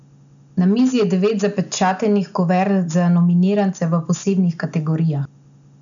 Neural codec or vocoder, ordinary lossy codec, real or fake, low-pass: none; none; real; 7.2 kHz